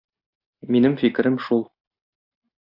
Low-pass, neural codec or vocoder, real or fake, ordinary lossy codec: 5.4 kHz; none; real; AAC, 48 kbps